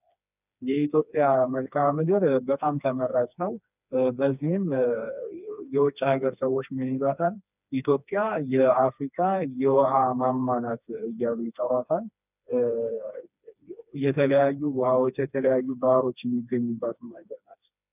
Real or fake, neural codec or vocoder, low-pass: fake; codec, 16 kHz, 2 kbps, FreqCodec, smaller model; 3.6 kHz